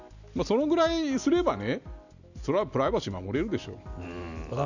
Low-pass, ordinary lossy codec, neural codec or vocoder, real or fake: 7.2 kHz; none; none; real